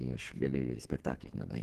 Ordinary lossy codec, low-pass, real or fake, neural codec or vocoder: Opus, 16 kbps; 14.4 kHz; fake; codec, 32 kHz, 1.9 kbps, SNAC